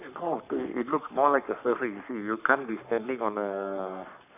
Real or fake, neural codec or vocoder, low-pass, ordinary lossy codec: fake; codec, 44.1 kHz, 3.4 kbps, Pupu-Codec; 3.6 kHz; none